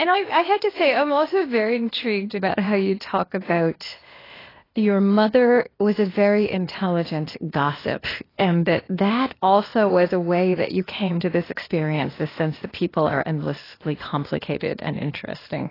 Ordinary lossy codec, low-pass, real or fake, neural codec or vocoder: AAC, 24 kbps; 5.4 kHz; fake; codec, 16 kHz, 0.8 kbps, ZipCodec